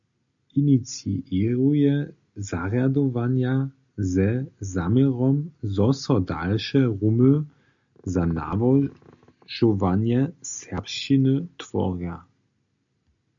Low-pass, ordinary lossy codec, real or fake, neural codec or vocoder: 7.2 kHz; MP3, 64 kbps; real; none